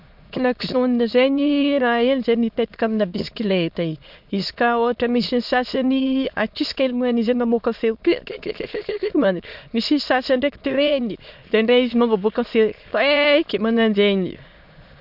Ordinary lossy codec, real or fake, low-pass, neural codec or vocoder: MP3, 48 kbps; fake; 5.4 kHz; autoencoder, 22.05 kHz, a latent of 192 numbers a frame, VITS, trained on many speakers